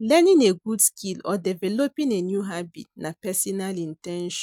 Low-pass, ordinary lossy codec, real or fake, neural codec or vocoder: 19.8 kHz; none; real; none